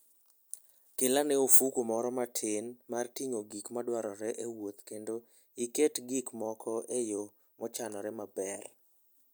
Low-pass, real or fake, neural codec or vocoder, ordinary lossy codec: none; real; none; none